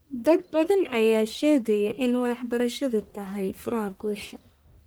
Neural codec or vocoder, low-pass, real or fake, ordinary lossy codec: codec, 44.1 kHz, 1.7 kbps, Pupu-Codec; none; fake; none